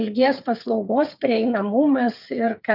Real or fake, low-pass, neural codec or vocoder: fake; 5.4 kHz; codec, 16 kHz, 8 kbps, FreqCodec, smaller model